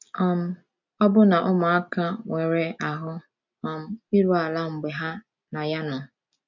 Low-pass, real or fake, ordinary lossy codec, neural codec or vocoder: 7.2 kHz; real; MP3, 64 kbps; none